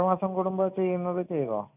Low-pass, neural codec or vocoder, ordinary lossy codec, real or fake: 3.6 kHz; none; none; real